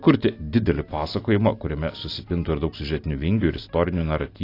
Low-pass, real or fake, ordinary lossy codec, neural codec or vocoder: 5.4 kHz; real; AAC, 32 kbps; none